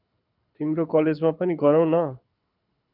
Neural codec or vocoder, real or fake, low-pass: codec, 24 kHz, 6 kbps, HILCodec; fake; 5.4 kHz